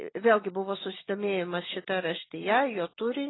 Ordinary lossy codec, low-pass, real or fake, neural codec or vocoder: AAC, 16 kbps; 7.2 kHz; real; none